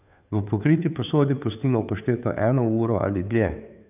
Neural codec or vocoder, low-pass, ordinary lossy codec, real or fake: codec, 16 kHz, 2 kbps, FunCodec, trained on Chinese and English, 25 frames a second; 3.6 kHz; none; fake